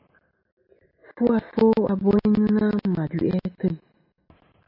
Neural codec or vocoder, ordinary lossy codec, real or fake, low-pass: none; MP3, 32 kbps; real; 5.4 kHz